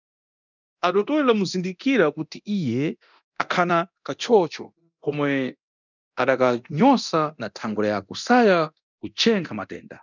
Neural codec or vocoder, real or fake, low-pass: codec, 24 kHz, 0.9 kbps, DualCodec; fake; 7.2 kHz